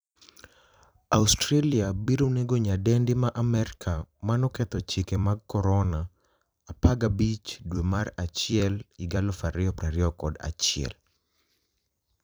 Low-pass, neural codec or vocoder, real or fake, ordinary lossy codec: none; vocoder, 44.1 kHz, 128 mel bands every 256 samples, BigVGAN v2; fake; none